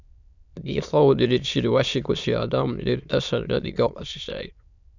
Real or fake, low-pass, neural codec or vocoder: fake; 7.2 kHz; autoencoder, 22.05 kHz, a latent of 192 numbers a frame, VITS, trained on many speakers